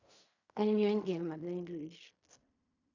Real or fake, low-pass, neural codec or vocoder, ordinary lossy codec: fake; 7.2 kHz; codec, 16 kHz in and 24 kHz out, 0.4 kbps, LongCat-Audio-Codec, fine tuned four codebook decoder; AAC, 48 kbps